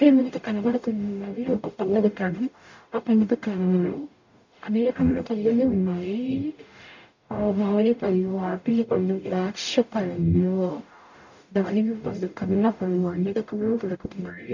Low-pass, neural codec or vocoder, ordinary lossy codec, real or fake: 7.2 kHz; codec, 44.1 kHz, 0.9 kbps, DAC; none; fake